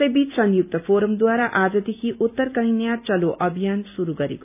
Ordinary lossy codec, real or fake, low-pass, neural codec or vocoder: none; real; 3.6 kHz; none